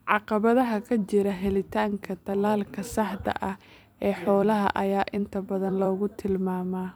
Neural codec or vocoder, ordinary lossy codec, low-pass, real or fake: vocoder, 44.1 kHz, 128 mel bands every 256 samples, BigVGAN v2; none; none; fake